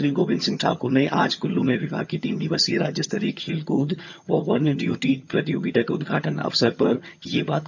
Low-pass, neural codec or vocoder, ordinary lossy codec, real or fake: 7.2 kHz; vocoder, 22.05 kHz, 80 mel bands, HiFi-GAN; none; fake